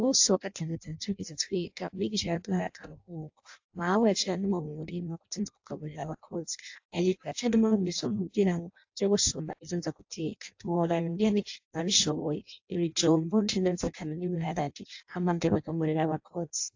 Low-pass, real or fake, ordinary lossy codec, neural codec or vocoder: 7.2 kHz; fake; AAC, 48 kbps; codec, 16 kHz in and 24 kHz out, 0.6 kbps, FireRedTTS-2 codec